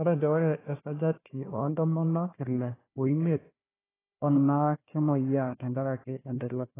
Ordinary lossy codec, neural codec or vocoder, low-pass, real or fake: AAC, 16 kbps; codec, 16 kHz, 1 kbps, FunCodec, trained on Chinese and English, 50 frames a second; 3.6 kHz; fake